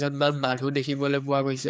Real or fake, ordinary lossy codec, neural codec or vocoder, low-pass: fake; none; codec, 16 kHz, 4 kbps, X-Codec, HuBERT features, trained on general audio; none